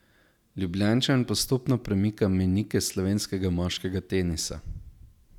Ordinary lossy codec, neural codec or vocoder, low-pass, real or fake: none; none; 19.8 kHz; real